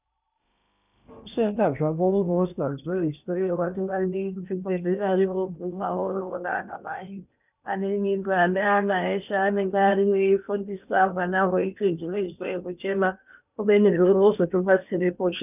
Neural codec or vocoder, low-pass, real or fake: codec, 16 kHz in and 24 kHz out, 0.8 kbps, FocalCodec, streaming, 65536 codes; 3.6 kHz; fake